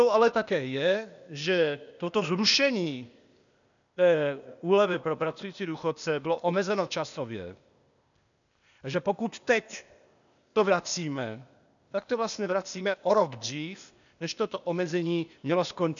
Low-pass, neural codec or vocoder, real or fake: 7.2 kHz; codec, 16 kHz, 0.8 kbps, ZipCodec; fake